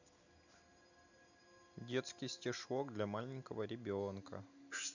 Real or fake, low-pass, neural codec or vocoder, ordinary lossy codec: real; 7.2 kHz; none; none